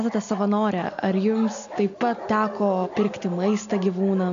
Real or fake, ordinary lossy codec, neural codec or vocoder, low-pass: real; MP3, 64 kbps; none; 7.2 kHz